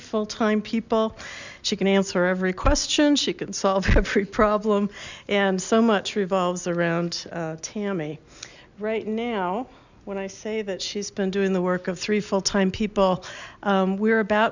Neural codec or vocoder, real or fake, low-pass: none; real; 7.2 kHz